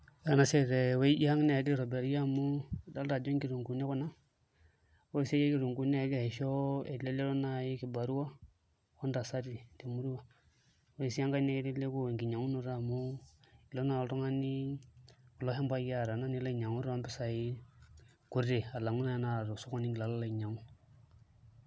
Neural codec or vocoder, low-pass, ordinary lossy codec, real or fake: none; none; none; real